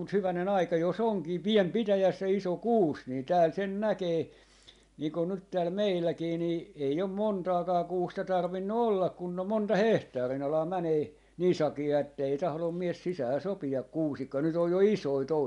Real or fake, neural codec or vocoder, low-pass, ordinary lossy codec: real; none; 10.8 kHz; MP3, 64 kbps